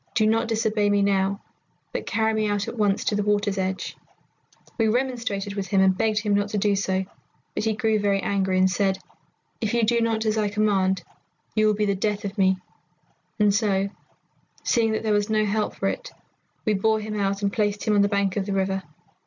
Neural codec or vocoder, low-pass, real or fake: none; 7.2 kHz; real